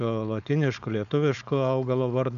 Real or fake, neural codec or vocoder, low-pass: fake; codec, 16 kHz, 4.8 kbps, FACodec; 7.2 kHz